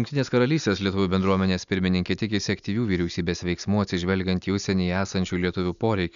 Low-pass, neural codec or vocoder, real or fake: 7.2 kHz; none; real